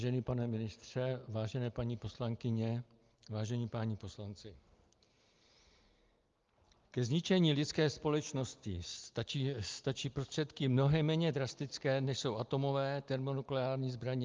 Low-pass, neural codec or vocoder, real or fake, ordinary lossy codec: 7.2 kHz; none; real; Opus, 32 kbps